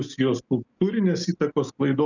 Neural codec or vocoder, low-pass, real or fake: none; 7.2 kHz; real